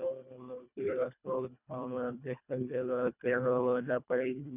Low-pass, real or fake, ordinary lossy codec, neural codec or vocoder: 3.6 kHz; fake; none; codec, 24 kHz, 1.5 kbps, HILCodec